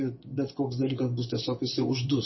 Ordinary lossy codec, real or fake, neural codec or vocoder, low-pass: MP3, 24 kbps; fake; vocoder, 24 kHz, 100 mel bands, Vocos; 7.2 kHz